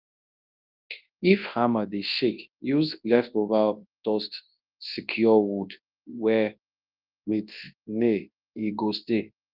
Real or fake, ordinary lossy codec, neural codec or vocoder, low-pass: fake; Opus, 24 kbps; codec, 24 kHz, 0.9 kbps, WavTokenizer, large speech release; 5.4 kHz